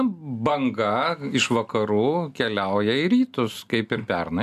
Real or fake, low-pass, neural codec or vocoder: real; 14.4 kHz; none